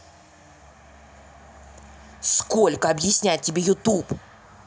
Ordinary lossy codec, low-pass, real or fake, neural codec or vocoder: none; none; real; none